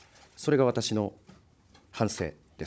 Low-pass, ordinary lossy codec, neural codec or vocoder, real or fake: none; none; codec, 16 kHz, 16 kbps, FunCodec, trained on Chinese and English, 50 frames a second; fake